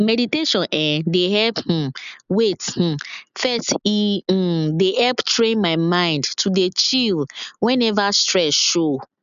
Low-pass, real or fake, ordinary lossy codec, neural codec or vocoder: 7.2 kHz; real; none; none